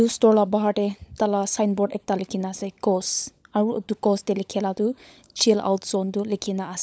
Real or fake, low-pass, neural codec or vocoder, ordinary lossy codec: fake; none; codec, 16 kHz, 16 kbps, FunCodec, trained on LibriTTS, 50 frames a second; none